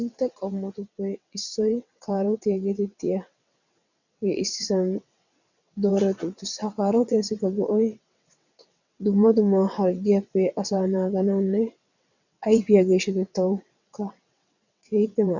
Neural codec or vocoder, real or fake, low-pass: vocoder, 22.05 kHz, 80 mel bands, WaveNeXt; fake; 7.2 kHz